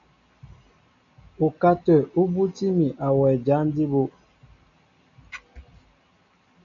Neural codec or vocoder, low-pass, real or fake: none; 7.2 kHz; real